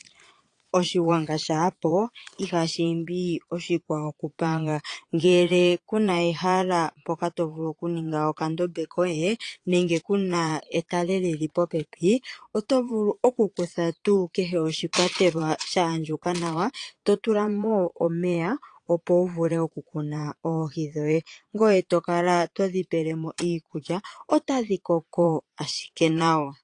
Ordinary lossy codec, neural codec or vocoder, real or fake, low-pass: AAC, 48 kbps; vocoder, 22.05 kHz, 80 mel bands, Vocos; fake; 9.9 kHz